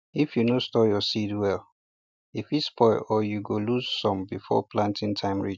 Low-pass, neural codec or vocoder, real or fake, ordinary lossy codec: none; none; real; none